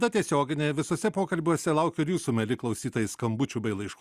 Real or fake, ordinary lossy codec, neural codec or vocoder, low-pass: real; Opus, 64 kbps; none; 14.4 kHz